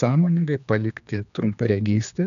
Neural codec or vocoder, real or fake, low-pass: codec, 16 kHz, 2 kbps, X-Codec, HuBERT features, trained on general audio; fake; 7.2 kHz